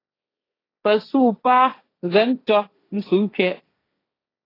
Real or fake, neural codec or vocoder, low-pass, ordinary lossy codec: fake; codec, 16 kHz, 1.1 kbps, Voila-Tokenizer; 5.4 kHz; AAC, 24 kbps